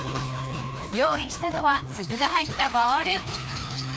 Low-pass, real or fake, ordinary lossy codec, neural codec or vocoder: none; fake; none; codec, 16 kHz, 2 kbps, FreqCodec, larger model